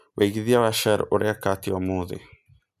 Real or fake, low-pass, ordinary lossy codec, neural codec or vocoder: real; 14.4 kHz; none; none